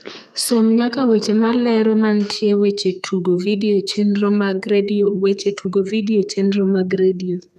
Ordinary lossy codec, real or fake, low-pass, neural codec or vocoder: none; fake; 14.4 kHz; codec, 32 kHz, 1.9 kbps, SNAC